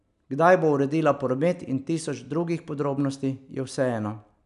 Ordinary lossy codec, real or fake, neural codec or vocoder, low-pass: none; real; none; 10.8 kHz